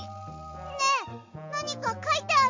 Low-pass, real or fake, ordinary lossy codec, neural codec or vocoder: 7.2 kHz; real; MP3, 48 kbps; none